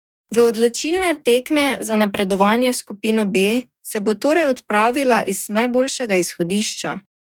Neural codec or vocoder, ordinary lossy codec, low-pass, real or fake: codec, 44.1 kHz, 2.6 kbps, DAC; none; 19.8 kHz; fake